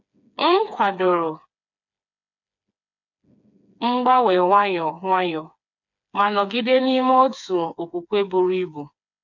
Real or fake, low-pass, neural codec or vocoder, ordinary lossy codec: fake; 7.2 kHz; codec, 16 kHz, 4 kbps, FreqCodec, smaller model; none